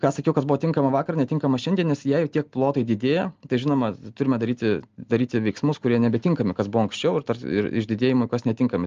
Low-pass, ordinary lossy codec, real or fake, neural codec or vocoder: 7.2 kHz; Opus, 24 kbps; real; none